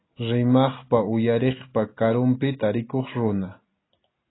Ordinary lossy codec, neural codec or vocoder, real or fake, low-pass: AAC, 16 kbps; none; real; 7.2 kHz